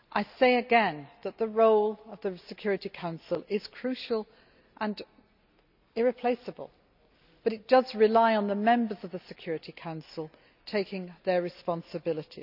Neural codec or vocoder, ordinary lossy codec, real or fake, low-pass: none; none; real; 5.4 kHz